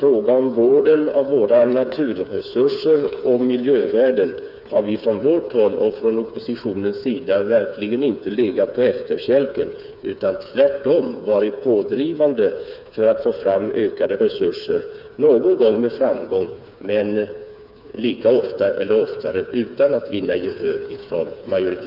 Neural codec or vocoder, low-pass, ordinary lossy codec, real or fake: codec, 16 kHz, 4 kbps, FreqCodec, smaller model; 5.4 kHz; none; fake